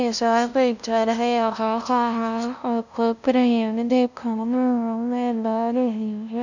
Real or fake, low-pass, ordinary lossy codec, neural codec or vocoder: fake; 7.2 kHz; none; codec, 16 kHz, 0.5 kbps, FunCodec, trained on LibriTTS, 25 frames a second